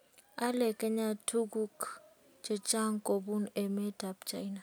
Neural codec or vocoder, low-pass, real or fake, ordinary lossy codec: none; none; real; none